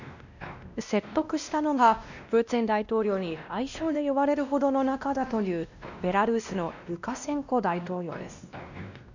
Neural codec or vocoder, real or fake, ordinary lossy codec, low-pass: codec, 16 kHz, 1 kbps, X-Codec, WavLM features, trained on Multilingual LibriSpeech; fake; none; 7.2 kHz